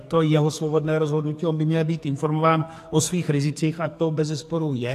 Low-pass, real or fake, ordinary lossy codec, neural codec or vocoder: 14.4 kHz; fake; AAC, 64 kbps; codec, 44.1 kHz, 2.6 kbps, SNAC